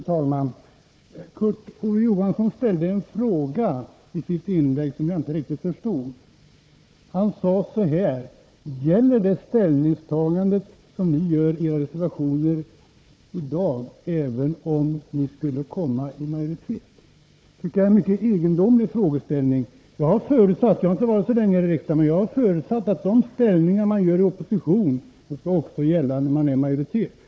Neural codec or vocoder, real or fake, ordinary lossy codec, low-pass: codec, 16 kHz, 4 kbps, FunCodec, trained on Chinese and English, 50 frames a second; fake; none; none